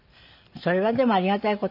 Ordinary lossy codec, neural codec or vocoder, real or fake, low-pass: none; none; real; 5.4 kHz